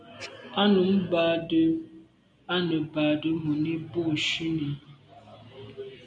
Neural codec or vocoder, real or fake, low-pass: none; real; 9.9 kHz